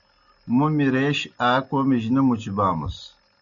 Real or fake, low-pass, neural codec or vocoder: real; 7.2 kHz; none